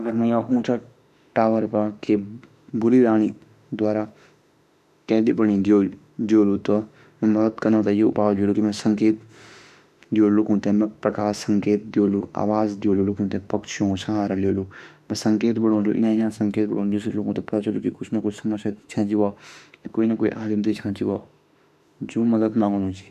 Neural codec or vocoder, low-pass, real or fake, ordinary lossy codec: autoencoder, 48 kHz, 32 numbers a frame, DAC-VAE, trained on Japanese speech; 14.4 kHz; fake; none